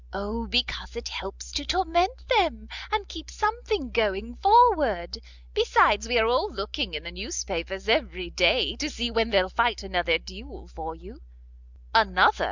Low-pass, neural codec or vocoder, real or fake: 7.2 kHz; none; real